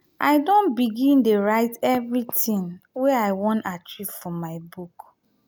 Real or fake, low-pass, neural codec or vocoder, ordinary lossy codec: real; none; none; none